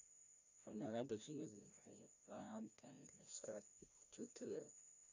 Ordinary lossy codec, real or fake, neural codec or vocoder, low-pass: none; fake; codec, 16 kHz, 1 kbps, FreqCodec, larger model; 7.2 kHz